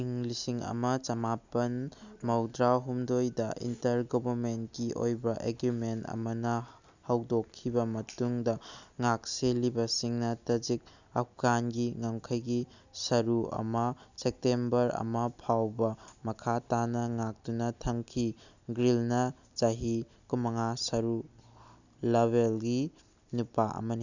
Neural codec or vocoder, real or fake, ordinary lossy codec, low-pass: none; real; none; 7.2 kHz